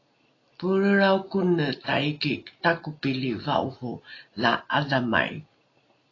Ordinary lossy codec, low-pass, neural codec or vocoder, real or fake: AAC, 32 kbps; 7.2 kHz; none; real